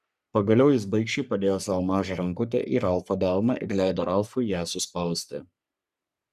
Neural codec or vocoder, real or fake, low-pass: codec, 44.1 kHz, 3.4 kbps, Pupu-Codec; fake; 14.4 kHz